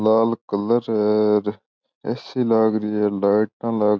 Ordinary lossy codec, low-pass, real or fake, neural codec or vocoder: none; none; real; none